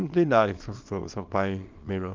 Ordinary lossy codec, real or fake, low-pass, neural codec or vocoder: Opus, 24 kbps; fake; 7.2 kHz; codec, 24 kHz, 0.9 kbps, WavTokenizer, small release